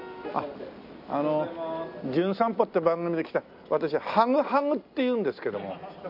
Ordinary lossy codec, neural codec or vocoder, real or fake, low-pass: none; none; real; 5.4 kHz